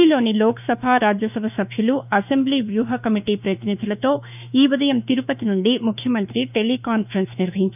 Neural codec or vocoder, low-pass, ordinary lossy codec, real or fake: autoencoder, 48 kHz, 32 numbers a frame, DAC-VAE, trained on Japanese speech; 3.6 kHz; none; fake